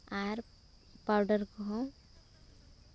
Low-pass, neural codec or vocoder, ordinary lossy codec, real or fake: none; none; none; real